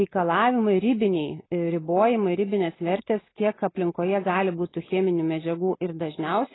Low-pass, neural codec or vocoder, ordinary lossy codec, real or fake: 7.2 kHz; none; AAC, 16 kbps; real